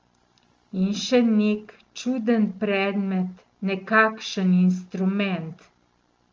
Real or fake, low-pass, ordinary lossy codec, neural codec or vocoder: real; 7.2 kHz; Opus, 32 kbps; none